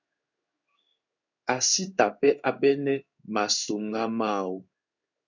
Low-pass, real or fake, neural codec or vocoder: 7.2 kHz; fake; codec, 16 kHz in and 24 kHz out, 1 kbps, XY-Tokenizer